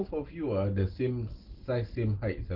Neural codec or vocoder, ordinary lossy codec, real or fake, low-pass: none; Opus, 16 kbps; real; 5.4 kHz